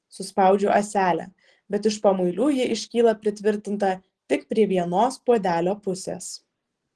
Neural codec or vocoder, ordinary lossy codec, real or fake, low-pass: none; Opus, 16 kbps; real; 10.8 kHz